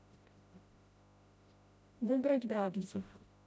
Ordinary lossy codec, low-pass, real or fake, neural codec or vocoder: none; none; fake; codec, 16 kHz, 0.5 kbps, FreqCodec, smaller model